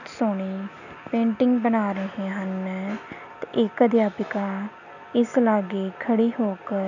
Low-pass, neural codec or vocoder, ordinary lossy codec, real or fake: 7.2 kHz; none; none; real